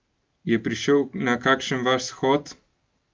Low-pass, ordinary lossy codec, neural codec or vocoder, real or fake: 7.2 kHz; Opus, 32 kbps; none; real